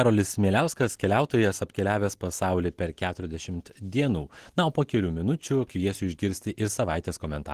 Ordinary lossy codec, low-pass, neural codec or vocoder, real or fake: Opus, 16 kbps; 14.4 kHz; none; real